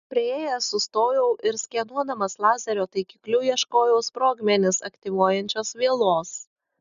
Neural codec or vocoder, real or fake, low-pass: none; real; 7.2 kHz